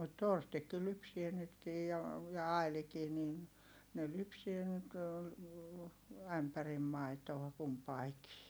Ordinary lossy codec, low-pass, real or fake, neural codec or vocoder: none; none; real; none